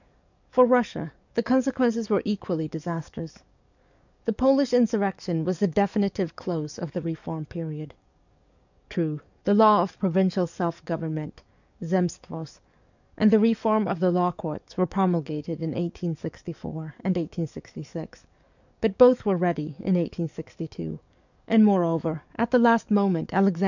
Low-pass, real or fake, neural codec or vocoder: 7.2 kHz; fake; codec, 44.1 kHz, 7.8 kbps, DAC